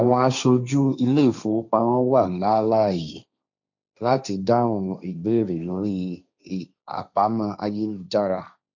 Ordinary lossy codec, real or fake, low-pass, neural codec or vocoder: none; fake; 7.2 kHz; codec, 16 kHz, 1.1 kbps, Voila-Tokenizer